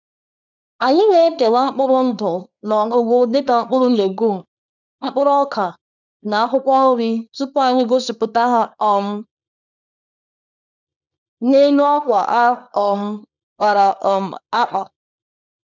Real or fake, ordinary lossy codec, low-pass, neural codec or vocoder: fake; none; 7.2 kHz; codec, 24 kHz, 0.9 kbps, WavTokenizer, small release